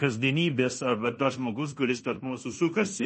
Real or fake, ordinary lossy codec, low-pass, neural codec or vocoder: fake; MP3, 32 kbps; 10.8 kHz; codec, 16 kHz in and 24 kHz out, 0.9 kbps, LongCat-Audio-Codec, fine tuned four codebook decoder